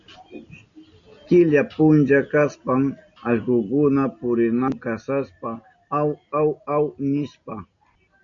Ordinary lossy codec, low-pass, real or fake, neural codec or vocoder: MP3, 96 kbps; 7.2 kHz; real; none